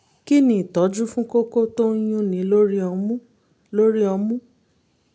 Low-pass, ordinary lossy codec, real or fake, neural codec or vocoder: none; none; real; none